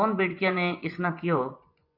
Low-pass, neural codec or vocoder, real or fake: 5.4 kHz; vocoder, 24 kHz, 100 mel bands, Vocos; fake